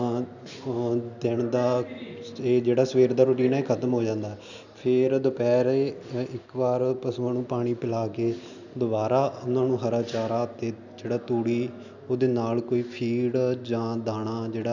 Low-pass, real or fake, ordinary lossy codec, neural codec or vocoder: 7.2 kHz; real; none; none